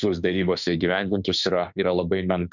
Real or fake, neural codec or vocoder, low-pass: fake; autoencoder, 48 kHz, 32 numbers a frame, DAC-VAE, trained on Japanese speech; 7.2 kHz